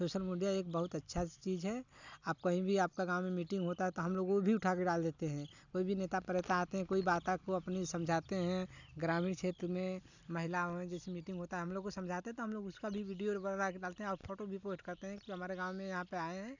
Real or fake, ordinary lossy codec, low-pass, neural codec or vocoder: real; none; 7.2 kHz; none